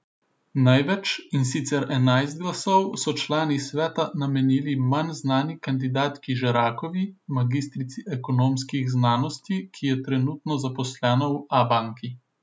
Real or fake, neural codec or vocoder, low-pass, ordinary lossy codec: real; none; none; none